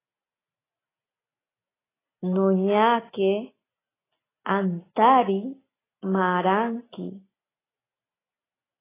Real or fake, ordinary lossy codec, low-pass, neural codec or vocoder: real; AAC, 16 kbps; 3.6 kHz; none